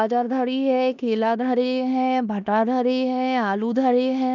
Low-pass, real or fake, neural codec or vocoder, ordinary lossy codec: 7.2 kHz; fake; codec, 16 kHz in and 24 kHz out, 0.9 kbps, LongCat-Audio-Codec, four codebook decoder; none